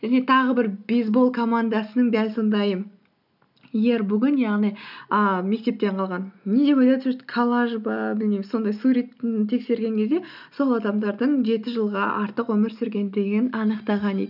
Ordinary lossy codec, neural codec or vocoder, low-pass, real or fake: none; none; 5.4 kHz; real